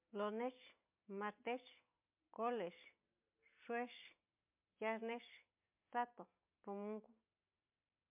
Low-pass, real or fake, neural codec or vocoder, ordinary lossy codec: 3.6 kHz; real; none; MP3, 32 kbps